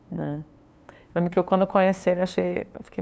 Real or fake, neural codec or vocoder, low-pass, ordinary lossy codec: fake; codec, 16 kHz, 2 kbps, FunCodec, trained on LibriTTS, 25 frames a second; none; none